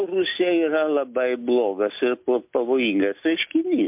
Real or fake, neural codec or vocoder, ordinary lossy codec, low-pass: real; none; MP3, 32 kbps; 3.6 kHz